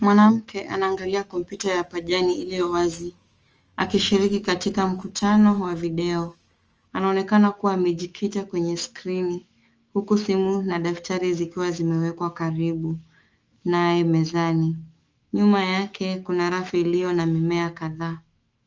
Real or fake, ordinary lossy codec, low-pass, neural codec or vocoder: fake; Opus, 24 kbps; 7.2 kHz; autoencoder, 48 kHz, 128 numbers a frame, DAC-VAE, trained on Japanese speech